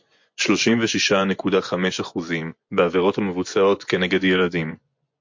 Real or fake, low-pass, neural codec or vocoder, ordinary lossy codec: fake; 7.2 kHz; vocoder, 24 kHz, 100 mel bands, Vocos; MP3, 48 kbps